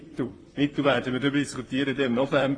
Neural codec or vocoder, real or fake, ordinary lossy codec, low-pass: vocoder, 44.1 kHz, 128 mel bands, Pupu-Vocoder; fake; AAC, 32 kbps; 9.9 kHz